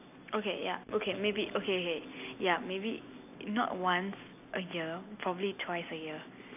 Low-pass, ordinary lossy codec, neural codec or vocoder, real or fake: 3.6 kHz; AAC, 32 kbps; none; real